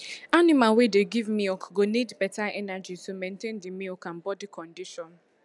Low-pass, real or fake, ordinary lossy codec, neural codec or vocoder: 10.8 kHz; real; none; none